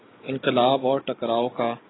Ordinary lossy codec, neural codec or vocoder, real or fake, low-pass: AAC, 16 kbps; none; real; 7.2 kHz